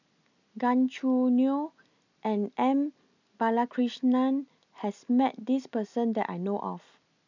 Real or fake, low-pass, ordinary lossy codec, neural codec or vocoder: real; 7.2 kHz; none; none